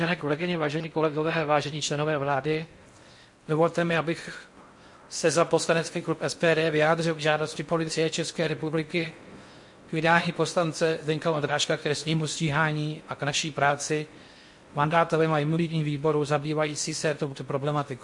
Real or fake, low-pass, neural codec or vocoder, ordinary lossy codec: fake; 10.8 kHz; codec, 16 kHz in and 24 kHz out, 0.6 kbps, FocalCodec, streaming, 4096 codes; MP3, 48 kbps